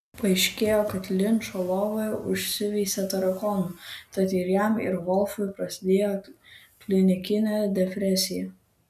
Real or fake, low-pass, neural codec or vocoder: real; 14.4 kHz; none